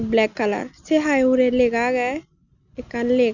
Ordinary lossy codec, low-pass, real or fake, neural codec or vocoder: none; 7.2 kHz; real; none